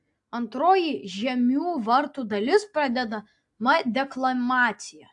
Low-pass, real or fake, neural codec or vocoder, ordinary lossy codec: 10.8 kHz; real; none; AAC, 64 kbps